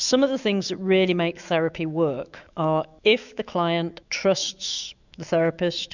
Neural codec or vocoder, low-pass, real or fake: autoencoder, 48 kHz, 128 numbers a frame, DAC-VAE, trained on Japanese speech; 7.2 kHz; fake